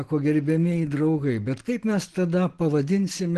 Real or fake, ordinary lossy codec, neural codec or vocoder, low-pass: real; Opus, 16 kbps; none; 10.8 kHz